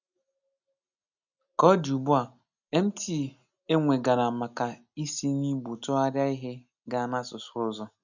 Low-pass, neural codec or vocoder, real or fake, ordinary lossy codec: 7.2 kHz; none; real; none